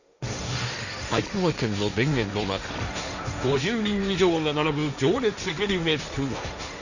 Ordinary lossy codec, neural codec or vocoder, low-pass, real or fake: none; codec, 16 kHz, 1.1 kbps, Voila-Tokenizer; 7.2 kHz; fake